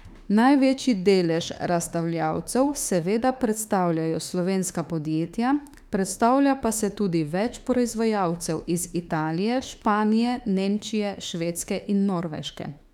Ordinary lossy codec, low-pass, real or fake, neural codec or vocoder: none; 19.8 kHz; fake; autoencoder, 48 kHz, 32 numbers a frame, DAC-VAE, trained on Japanese speech